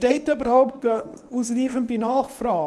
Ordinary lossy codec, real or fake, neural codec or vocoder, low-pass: none; fake; codec, 24 kHz, 0.9 kbps, WavTokenizer, medium speech release version 1; none